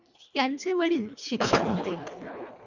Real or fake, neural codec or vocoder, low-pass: fake; codec, 24 kHz, 1.5 kbps, HILCodec; 7.2 kHz